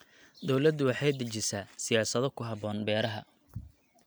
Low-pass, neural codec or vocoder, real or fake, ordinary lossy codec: none; none; real; none